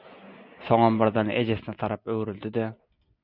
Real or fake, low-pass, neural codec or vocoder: real; 5.4 kHz; none